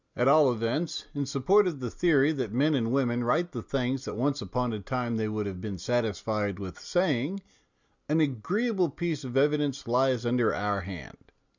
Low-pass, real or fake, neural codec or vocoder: 7.2 kHz; real; none